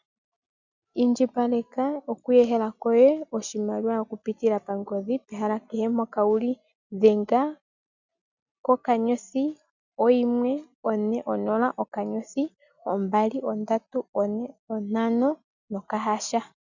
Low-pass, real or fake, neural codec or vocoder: 7.2 kHz; real; none